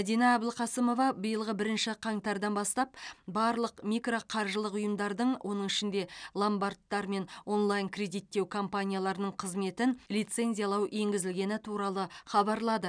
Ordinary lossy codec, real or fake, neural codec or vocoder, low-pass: none; real; none; 9.9 kHz